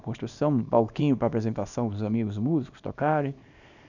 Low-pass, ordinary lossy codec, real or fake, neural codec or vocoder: 7.2 kHz; none; fake; codec, 24 kHz, 0.9 kbps, WavTokenizer, small release